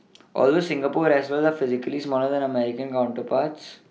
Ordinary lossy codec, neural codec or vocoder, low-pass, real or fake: none; none; none; real